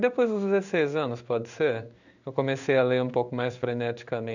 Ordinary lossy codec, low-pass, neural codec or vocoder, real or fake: none; 7.2 kHz; codec, 16 kHz in and 24 kHz out, 1 kbps, XY-Tokenizer; fake